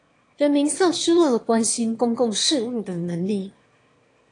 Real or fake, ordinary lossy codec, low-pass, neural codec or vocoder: fake; AAC, 48 kbps; 9.9 kHz; autoencoder, 22.05 kHz, a latent of 192 numbers a frame, VITS, trained on one speaker